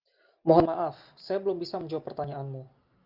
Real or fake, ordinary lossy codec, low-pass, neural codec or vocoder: real; Opus, 24 kbps; 5.4 kHz; none